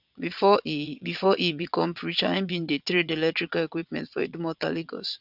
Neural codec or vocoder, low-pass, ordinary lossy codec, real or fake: vocoder, 22.05 kHz, 80 mel bands, Vocos; 5.4 kHz; none; fake